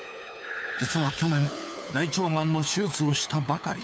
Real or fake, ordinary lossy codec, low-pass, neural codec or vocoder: fake; none; none; codec, 16 kHz, 4 kbps, FunCodec, trained on LibriTTS, 50 frames a second